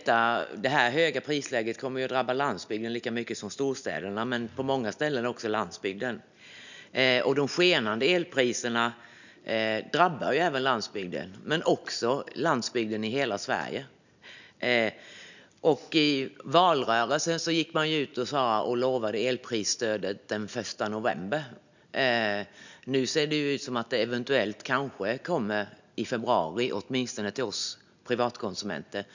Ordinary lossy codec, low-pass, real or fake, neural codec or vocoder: none; 7.2 kHz; real; none